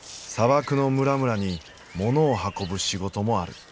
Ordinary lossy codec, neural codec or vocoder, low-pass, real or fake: none; none; none; real